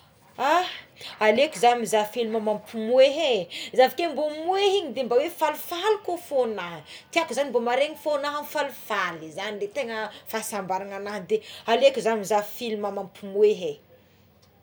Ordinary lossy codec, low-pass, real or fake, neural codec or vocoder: none; none; real; none